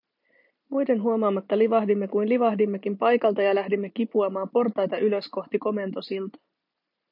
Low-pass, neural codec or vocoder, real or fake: 5.4 kHz; none; real